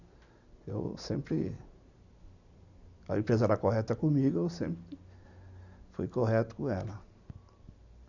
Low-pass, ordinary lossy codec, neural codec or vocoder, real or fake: 7.2 kHz; none; none; real